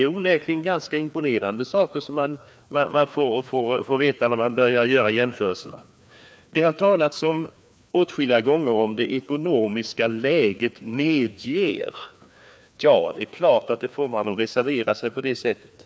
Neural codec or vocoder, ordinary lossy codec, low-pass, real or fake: codec, 16 kHz, 2 kbps, FreqCodec, larger model; none; none; fake